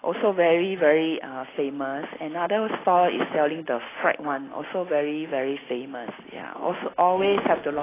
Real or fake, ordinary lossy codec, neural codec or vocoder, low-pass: real; AAC, 16 kbps; none; 3.6 kHz